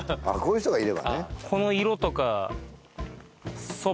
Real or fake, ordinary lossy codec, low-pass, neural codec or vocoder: real; none; none; none